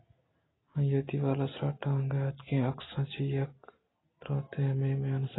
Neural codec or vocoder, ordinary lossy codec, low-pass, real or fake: none; AAC, 16 kbps; 7.2 kHz; real